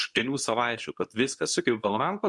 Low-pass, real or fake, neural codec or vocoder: 10.8 kHz; fake; codec, 24 kHz, 0.9 kbps, WavTokenizer, medium speech release version 2